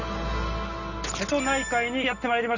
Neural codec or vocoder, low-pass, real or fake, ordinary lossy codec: none; 7.2 kHz; real; none